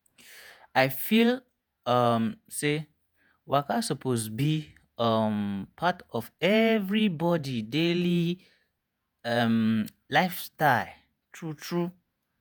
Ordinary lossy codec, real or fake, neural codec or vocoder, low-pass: none; fake; vocoder, 48 kHz, 128 mel bands, Vocos; none